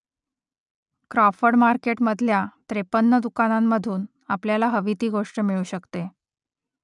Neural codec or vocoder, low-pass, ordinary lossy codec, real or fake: none; 10.8 kHz; none; real